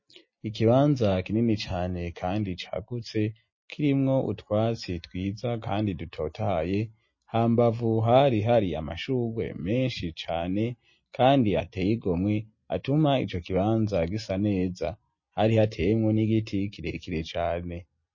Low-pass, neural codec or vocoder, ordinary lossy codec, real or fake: 7.2 kHz; none; MP3, 32 kbps; real